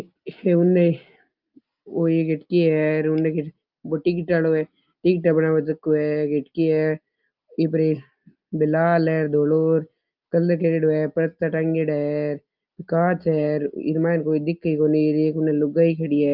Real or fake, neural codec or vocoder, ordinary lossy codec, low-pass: real; none; Opus, 24 kbps; 5.4 kHz